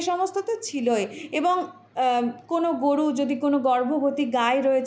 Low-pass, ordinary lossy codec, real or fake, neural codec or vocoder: none; none; real; none